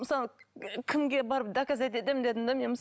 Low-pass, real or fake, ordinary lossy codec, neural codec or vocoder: none; real; none; none